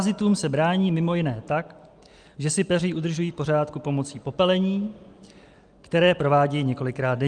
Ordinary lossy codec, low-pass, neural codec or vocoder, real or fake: Opus, 32 kbps; 9.9 kHz; none; real